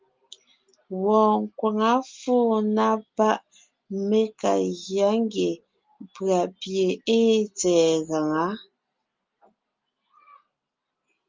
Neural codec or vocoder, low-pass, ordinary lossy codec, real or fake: none; 7.2 kHz; Opus, 32 kbps; real